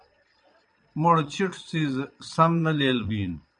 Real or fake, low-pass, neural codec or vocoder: fake; 9.9 kHz; vocoder, 22.05 kHz, 80 mel bands, Vocos